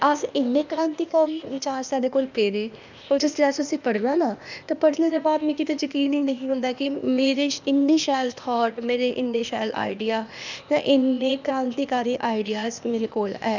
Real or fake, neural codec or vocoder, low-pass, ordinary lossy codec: fake; codec, 16 kHz, 0.8 kbps, ZipCodec; 7.2 kHz; none